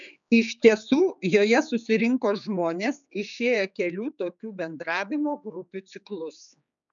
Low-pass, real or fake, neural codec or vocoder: 7.2 kHz; fake; codec, 16 kHz, 4 kbps, X-Codec, HuBERT features, trained on general audio